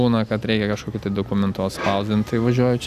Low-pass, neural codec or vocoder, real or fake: 14.4 kHz; none; real